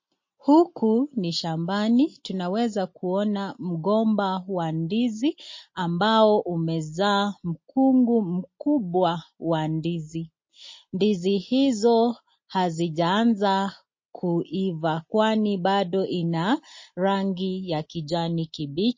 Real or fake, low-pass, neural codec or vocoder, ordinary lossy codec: real; 7.2 kHz; none; MP3, 32 kbps